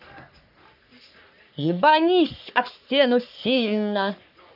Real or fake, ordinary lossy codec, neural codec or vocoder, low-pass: fake; none; codec, 44.1 kHz, 3.4 kbps, Pupu-Codec; 5.4 kHz